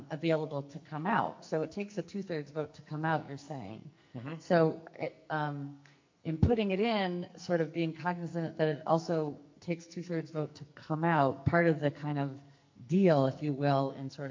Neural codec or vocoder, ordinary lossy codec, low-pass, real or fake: codec, 44.1 kHz, 2.6 kbps, SNAC; MP3, 48 kbps; 7.2 kHz; fake